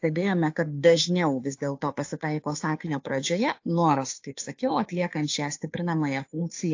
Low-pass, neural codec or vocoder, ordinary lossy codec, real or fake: 7.2 kHz; codec, 16 kHz, 2 kbps, FunCodec, trained on Chinese and English, 25 frames a second; AAC, 48 kbps; fake